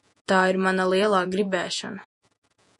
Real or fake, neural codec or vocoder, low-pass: fake; vocoder, 48 kHz, 128 mel bands, Vocos; 10.8 kHz